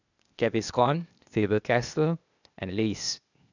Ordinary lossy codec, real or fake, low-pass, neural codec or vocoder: none; fake; 7.2 kHz; codec, 16 kHz, 0.8 kbps, ZipCodec